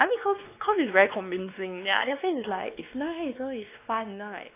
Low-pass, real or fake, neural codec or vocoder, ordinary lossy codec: 3.6 kHz; fake; codec, 16 kHz, 2 kbps, X-Codec, WavLM features, trained on Multilingual LibriSpeech; AAC, 24 kbps